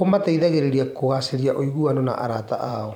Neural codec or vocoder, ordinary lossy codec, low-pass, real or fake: vocoder, 44.1 kHz, 128 mel bands every 512 samples, BigVGAN v2; none; 19.8 kHz; fake